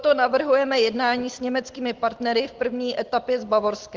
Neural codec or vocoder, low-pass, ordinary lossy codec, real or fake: none; 7.2 kHz; Opus, 16 kbps; real